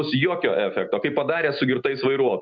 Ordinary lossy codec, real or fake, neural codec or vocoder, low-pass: MP3, 64 kbps; real; none; 7.2 kHz